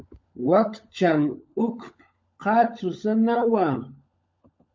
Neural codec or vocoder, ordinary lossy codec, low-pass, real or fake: codec, 16 kHz, 16 kbps, FunCodec, trained on LibriTTS, 50 frames a second; MP3, 48 kbps; 7.2 kHz; fake